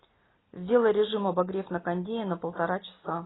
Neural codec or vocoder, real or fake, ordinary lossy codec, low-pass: none; real; AAC, 16 kbps; 7.2 kHz